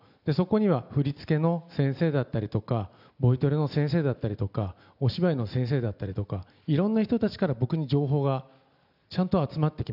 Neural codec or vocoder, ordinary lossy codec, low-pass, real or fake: none; none; 5.4 kHz; real